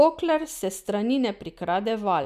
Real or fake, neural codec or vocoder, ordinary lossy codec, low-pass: real; none; none; 14.4 kHz